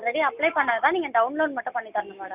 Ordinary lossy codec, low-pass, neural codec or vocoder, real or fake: none; 3.6 kHz; none; real